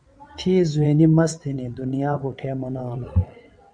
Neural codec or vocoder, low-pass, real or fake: vocoder, 22.05 kHz, 80 mel bands, WaveNeXt; 9.9 kHz; fake